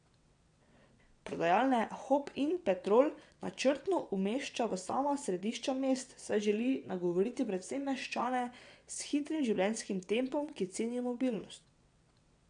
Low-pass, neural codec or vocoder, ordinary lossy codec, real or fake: 9.9 kHz; vocoder, 22.05 kHz, 80 mel bands, WaveNeXt; none; fake